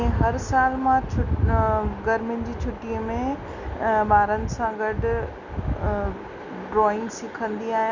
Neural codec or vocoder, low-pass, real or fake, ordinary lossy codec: none; 7.2 kHz; real; none